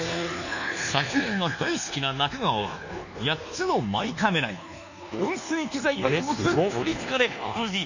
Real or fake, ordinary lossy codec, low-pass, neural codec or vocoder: fake; none; 7.2 kHz; codec, 24 kHz, 1.2 kbps, DualCodec